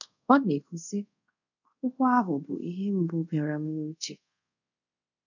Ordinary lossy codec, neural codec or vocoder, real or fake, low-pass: none; codec, 24 kHz, 0.5 kbps, DualCodec; fake; 7.2 kHz